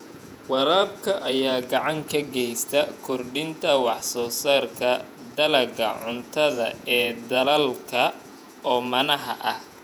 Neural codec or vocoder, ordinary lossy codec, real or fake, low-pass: vocoder, 48 kHz, 128 mel bands, Vocos; none; fake; 19.8 kHz